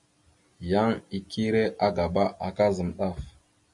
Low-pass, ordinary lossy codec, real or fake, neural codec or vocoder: 10.8 kHz; MP3, 48 kbps; real; none